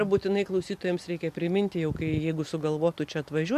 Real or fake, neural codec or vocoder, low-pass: real; none; 14.4 kHz